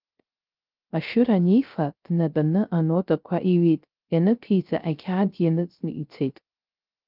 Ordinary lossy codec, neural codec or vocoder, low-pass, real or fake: Opus, 24 kbps; codec, 16 kHz, 0.3 kbps, FocalCodec; 5.4 kHz; fake